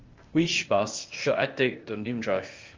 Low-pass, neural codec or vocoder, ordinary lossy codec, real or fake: 7.2 kHz; codec, 16 kHz, 0.8 kbps, ZipCodec; Opus, 32 kbps; fake